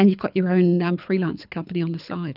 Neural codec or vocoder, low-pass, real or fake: codec, 24 kHz, 6 kbps, HILCodec; 5.4 kHz; fake